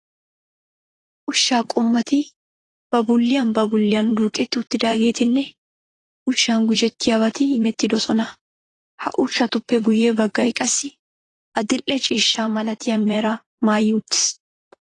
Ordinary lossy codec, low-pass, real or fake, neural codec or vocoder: AAC, 32 kbps; 10.8 kHz; fake; vocoder, 44.1 kHz, 128 mel bands, Pupu-Vocoder